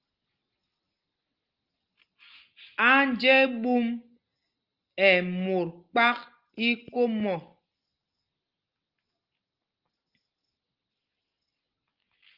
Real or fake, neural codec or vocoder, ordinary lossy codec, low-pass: real; none; Opus, 32 kbps; 5.4 kHz